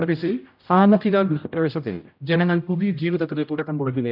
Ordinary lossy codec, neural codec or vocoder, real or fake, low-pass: none; codec, 16 kHz, 0.5 kbps, X-Codec, HuBERT features, trained on general audio; fake; 5.4 kHz